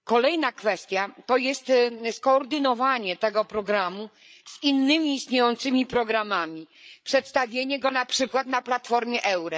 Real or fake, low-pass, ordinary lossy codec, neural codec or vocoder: fake; none; none; codec, 16 kHz, 8 kbps, FreqCodec, larger model